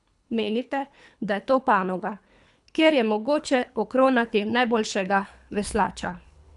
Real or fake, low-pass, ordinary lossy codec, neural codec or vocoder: fake; 10.8 kHz; none; codec, 24 kHz, 3 kbps, HILCodec